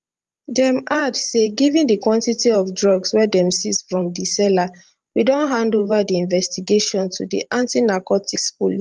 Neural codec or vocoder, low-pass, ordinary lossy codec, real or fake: vocoder, 44.1 kHz, 128 mel bands every 512 samples, BigVGAN v2; 10.8 kHz; Opus, 32 kbps; fake